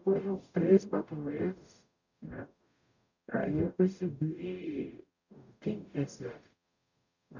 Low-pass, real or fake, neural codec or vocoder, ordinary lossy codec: 7.2 kHz; fake; codec, 44.1 kHz, 0.9 kbps, DAC; none